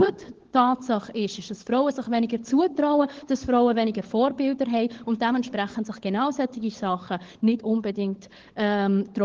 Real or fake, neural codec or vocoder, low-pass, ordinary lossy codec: fake; codec, 16 kHz, 16 kbps, FunCodec, trained on LibriTTS, 50 frames a second; 7.2 kHz; Opus, 16 kbps